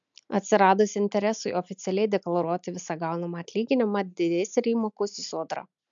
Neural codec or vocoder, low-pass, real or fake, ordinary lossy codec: none; 7.2 kHz; real; MP3, 64 kbps